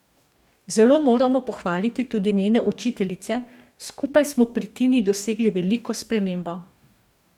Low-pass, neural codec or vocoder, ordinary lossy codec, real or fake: 19.8 kHz; codec, 44.1 kHz, 2.6 kbps, DAC; none; fake